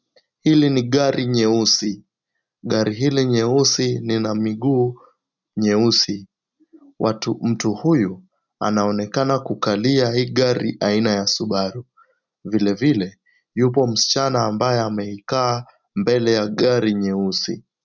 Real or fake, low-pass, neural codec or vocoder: real; 7.2 kHz; none